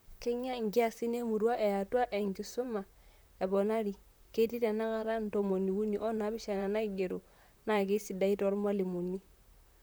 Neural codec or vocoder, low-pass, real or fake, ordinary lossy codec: vocoder, 44.1 kHz, 128 mel bands, Pupu-Vocoder; none; fake; none